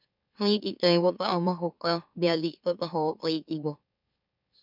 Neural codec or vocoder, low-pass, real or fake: autoencoder, 44.1 kHz, a latent of 192 numbers a frame, MeloTTS; 5.4 kHz; fake